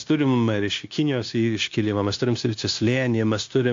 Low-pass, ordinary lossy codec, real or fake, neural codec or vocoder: 7.2 kHz; AAC, 48 kbps; fake; codec, 16 kHz, 0.9 kbps, LongCat-Audio-Codec